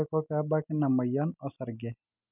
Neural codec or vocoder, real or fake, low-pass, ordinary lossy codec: none; real; 3.6 kHz; none